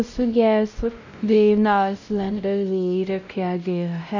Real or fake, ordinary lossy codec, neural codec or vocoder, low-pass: fake; none; codec, 16 kHz, 0.5 kbps, X-Codec, WavLM features, trained on Multilingual LibriSpeech; 7.2 kHz